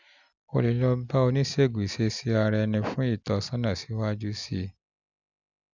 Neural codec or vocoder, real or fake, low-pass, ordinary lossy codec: none; real; 7.2 kHz; none